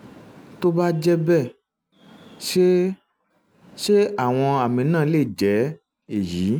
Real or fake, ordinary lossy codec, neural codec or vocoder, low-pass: real; none; none; none